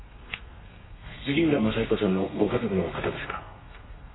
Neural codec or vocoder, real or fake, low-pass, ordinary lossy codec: codec, 32 kHz, 1.9 kbps, SNAC; fake; 7.2 kHz; AAC, 16 kbps